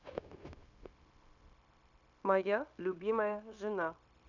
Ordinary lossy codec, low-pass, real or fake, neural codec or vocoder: none; 7.2 kHz; fake; codec, 16 kHz, 0.9 kbps, LongCat-Audio-Codec